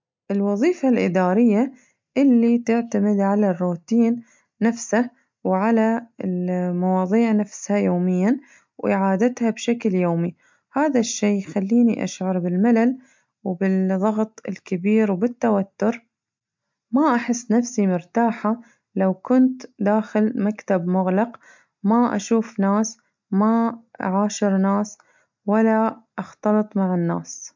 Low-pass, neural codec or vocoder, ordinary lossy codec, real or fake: 7.2 kHz; none; MP3, 64 kbps; real